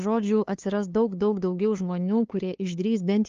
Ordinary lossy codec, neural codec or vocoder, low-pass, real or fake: Opus, 24 kbps; codec, 16 kHz, 2 kbps, FunCodec, trained on LibriTTS, 25 frames a second; 7.2 kHz; fake